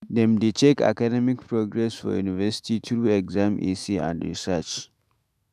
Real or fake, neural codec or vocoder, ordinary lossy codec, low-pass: fake; autoencoder, 48 kHz, 128 numbers a frame, DAC-VAE, trained on Japanese speech; none; 14.4 kHz